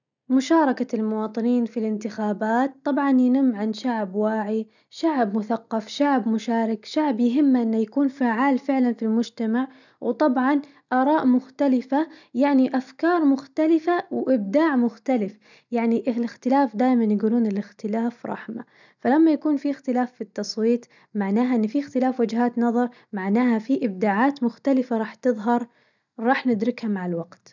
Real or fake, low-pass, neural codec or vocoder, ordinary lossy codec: real; 7.2 kHz; none; none